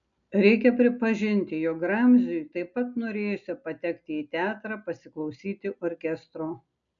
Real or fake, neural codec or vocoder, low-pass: real; none; 7.2 kHz